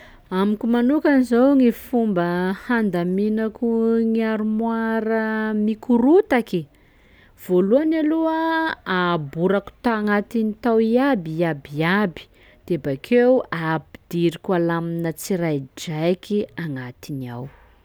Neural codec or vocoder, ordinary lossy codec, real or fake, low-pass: none; none; real; none